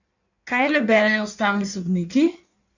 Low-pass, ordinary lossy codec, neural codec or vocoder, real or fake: 7.2 kHz; none; codec, 16 kHz in and 24 kHz out, 1.1 kbps, FireRedTTS-2 codec; fake